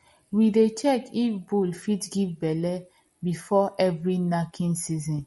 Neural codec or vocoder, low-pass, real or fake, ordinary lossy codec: none; 14.4 kHz; real; MP3, 48 kbps